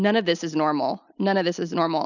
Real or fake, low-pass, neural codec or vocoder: real; 7.2 kHz; none